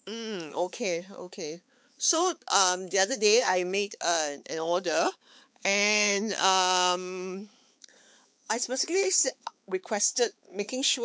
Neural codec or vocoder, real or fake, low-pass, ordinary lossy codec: codec, 16 kHz, 4 kbps, X-Codec, HuBERT features, trained on balanced general audio; fake; none; none